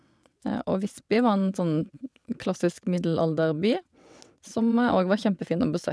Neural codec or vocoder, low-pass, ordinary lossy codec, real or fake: vocoder, 22.05 kHz, 80 mel bands, WaveNeXt; none; none; fake